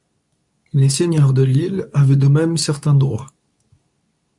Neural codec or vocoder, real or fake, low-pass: codec, 24 kHz, 0.9 kbps, WavTokenizer, medium speech release version 2; fake; 10.8 kHz